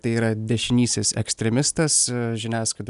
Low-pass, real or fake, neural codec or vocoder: 10.8 kHz; real; none